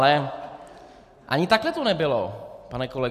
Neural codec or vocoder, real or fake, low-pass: none; real; 14.4 kHz